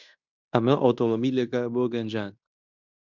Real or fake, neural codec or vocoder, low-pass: fake; codec, 16 kHz in and 24 kHz out, 0.9 kbps, LongCat-Audio-Codec, fine tuned four codebook decoder; 7.2 kHz